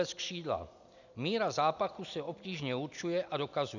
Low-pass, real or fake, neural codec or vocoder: 7.2 kHz; real; none